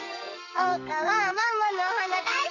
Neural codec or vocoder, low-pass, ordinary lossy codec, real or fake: codec, 44.1 kHz, 2.6 kbps, SNAC; 7.2 kHz; none; fake